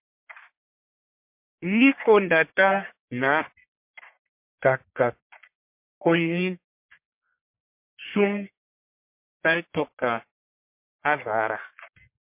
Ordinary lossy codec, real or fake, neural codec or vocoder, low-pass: MP3, 32 kbps; fake; codec, 44.1 kHz, 1.7 kbps, Pupu-Codec; 3.6 kHz